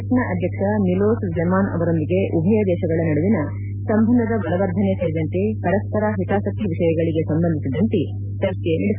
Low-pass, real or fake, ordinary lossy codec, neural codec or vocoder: 3.6 kHz; real; none; none